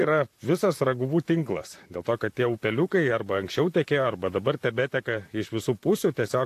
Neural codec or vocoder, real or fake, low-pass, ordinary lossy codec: vocoder, 44.1 kHz, 128 mel bands, Pupu-Vocoder; fake; 14.4 kHz; AAC, 64 kbps